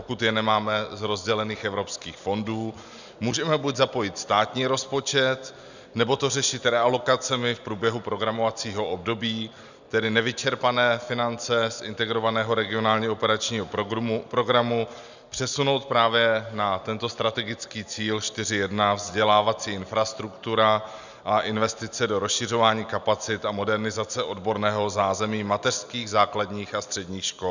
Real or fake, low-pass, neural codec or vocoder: real; 7.2 kHz; none